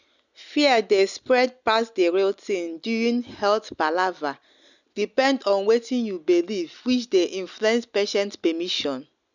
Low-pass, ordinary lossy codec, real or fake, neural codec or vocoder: 7.2 kHz; none; real; none